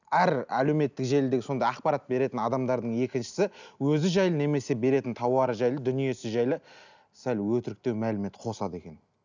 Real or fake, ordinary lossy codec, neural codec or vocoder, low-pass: real; none; none; 7.2 kHz